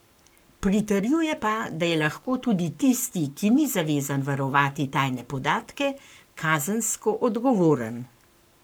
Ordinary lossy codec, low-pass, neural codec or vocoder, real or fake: none; none; codec, 44.1 kHz, 7.8 kbps, Pupu-Codec; fake